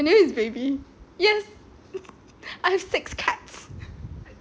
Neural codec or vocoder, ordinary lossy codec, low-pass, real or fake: none; none; none; real